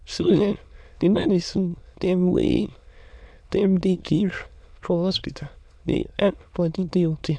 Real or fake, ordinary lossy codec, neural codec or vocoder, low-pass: fake; none; autoencoder, 22.05 kHz, a latent of 192 numbers a frame, VITS, trained on many speakers; none